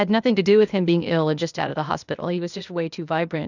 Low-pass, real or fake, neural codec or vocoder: 7.2 kHz; fake; codec, 16 kHz, 0.8 kbps, ZipCodec